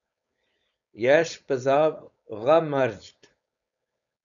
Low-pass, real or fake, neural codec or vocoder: 7.2 kHz; fake; codec, 16 kHz, 4.8 kbps, FACodec